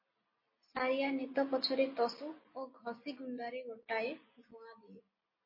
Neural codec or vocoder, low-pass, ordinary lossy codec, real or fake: none; 5.4 kHz; MP3, 24 kbps; real